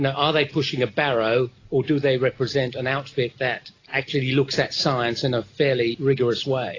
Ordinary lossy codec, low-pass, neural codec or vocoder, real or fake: AAC, 32 kbps; 7.2 kHz; none; real